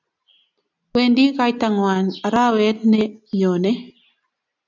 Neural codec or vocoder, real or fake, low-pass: none; real; 7.2 kHz